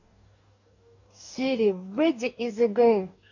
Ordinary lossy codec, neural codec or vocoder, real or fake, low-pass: none; codec, 44.1 kHz, 2.6 kbps, DAC; fake; 7.2 kHz